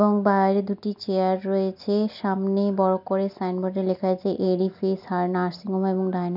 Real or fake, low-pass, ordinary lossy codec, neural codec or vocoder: real; 5.4 kHz; none; none